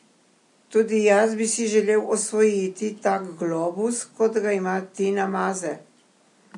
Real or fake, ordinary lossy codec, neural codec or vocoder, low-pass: real; MP3, 48 kbps; none; 10.8 kHz